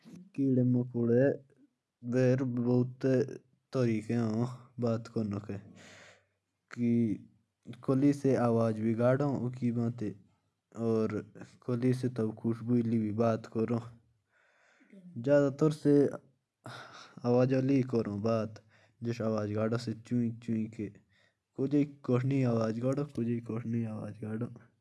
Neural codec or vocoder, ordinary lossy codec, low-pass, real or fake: none; none; none; real